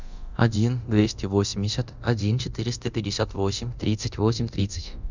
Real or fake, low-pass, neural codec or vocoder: fake; 7.2 kHz; codec, 24 kHz, 0.9 kbps, DualCodec